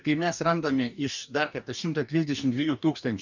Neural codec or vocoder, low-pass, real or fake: codec, 44.1 kHz, 2.6 kbps, DAC; 7.2 kHz; fake